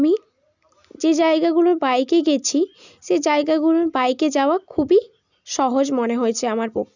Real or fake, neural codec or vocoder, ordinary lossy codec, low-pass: real; none; none; 7.2 kHz